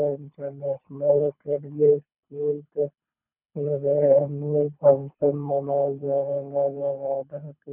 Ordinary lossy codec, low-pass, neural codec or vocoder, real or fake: none; 3.6 kHz; codec, 24 kHz, 3 kbps, HILCodec; fake